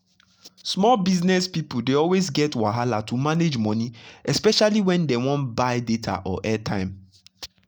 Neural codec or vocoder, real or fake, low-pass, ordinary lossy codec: none; real; 19.8 kHz; none